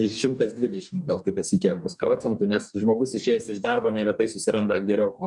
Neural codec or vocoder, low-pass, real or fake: codec, 44.1 kHz, 2.6 kbps, DAC; 10.8 kHz; fake